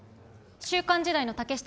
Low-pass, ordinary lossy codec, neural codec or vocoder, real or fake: none; none; none; real